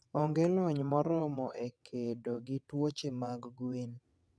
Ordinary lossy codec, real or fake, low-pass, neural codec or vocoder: none; fake; none; vocoder, 22.05 kHz, 80 mel bands, WaveNeXt